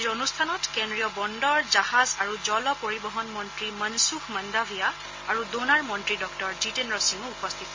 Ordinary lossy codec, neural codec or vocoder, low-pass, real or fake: MP3, 32 kbps; none; 7.2 kHz; real